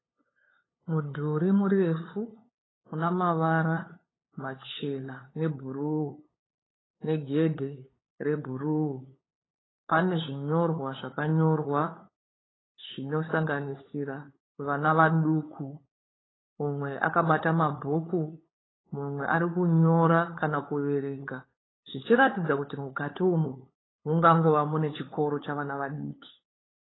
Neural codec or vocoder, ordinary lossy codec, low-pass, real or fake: codec, 16 kHz, 8 kbps, FunCodec, trained on LibriTTS, 25 frames a second; AAC, 16 kbps; 7.2 kHz; fake